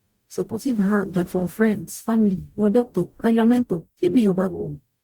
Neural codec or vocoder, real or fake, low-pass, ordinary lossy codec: codec, 44.1 kHz, 0.9 kbps, DAC; fake; 19.8 kHz; Opus, 64 kbps